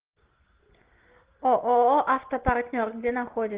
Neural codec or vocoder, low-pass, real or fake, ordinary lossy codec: none; 3.6 kHz; real; Opus, 16 kbps